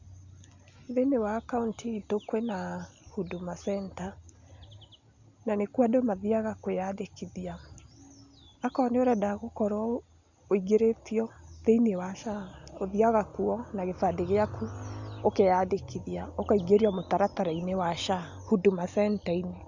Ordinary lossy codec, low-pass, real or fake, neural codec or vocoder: Opus, 64 kbps; 7.2 kHz; real; none